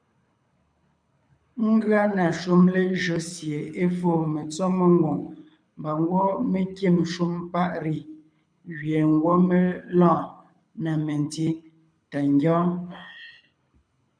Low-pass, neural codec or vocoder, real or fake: 9.9 kHz; codec, 24 kHz, 6 kbps, HILCodec; fake